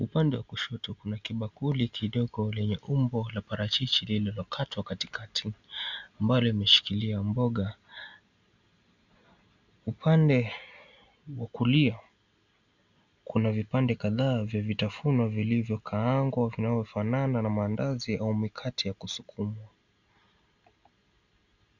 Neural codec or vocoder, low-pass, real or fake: none; 7.2 kHz; real